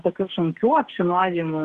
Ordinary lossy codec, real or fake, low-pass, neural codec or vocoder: Opus, 16 kbps; fake; 14.4 kHz; codec, 44.1 kHz, 2.6 kbps, SNAC